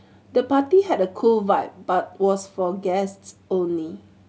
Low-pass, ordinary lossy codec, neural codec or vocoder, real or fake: none; none; none; real